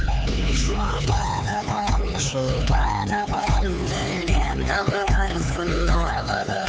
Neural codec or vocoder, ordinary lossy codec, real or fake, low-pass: codec, 16 kHz, 4 kbps, X-Codec, HuBERT features, trained on LibriSpeech; none; fake; none